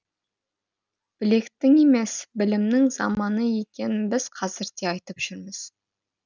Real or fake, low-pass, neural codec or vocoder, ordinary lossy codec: real; 7.2 kHz; none; none